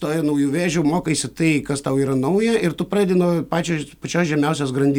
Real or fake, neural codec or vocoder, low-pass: real; none; 19.8 kHz